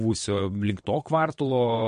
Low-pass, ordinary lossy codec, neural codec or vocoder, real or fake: 9.9 kHz; MP3, 48 kbps; vocoder, 22.05 kHz, 80 mel bands, WaveNeXt; fake